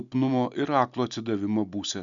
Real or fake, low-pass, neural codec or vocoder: real; 7.2 kHz; none